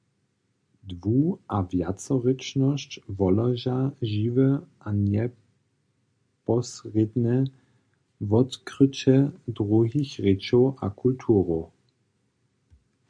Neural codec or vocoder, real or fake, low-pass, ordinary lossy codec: none; real; 9.9 kHz; MP3, 64 kbps